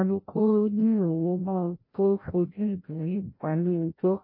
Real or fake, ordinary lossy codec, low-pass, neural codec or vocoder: fake; MP3, 32 kbps; 5.4 kHz; codec, 16 kHz, 0.5 kbps, FreqCodec, larger model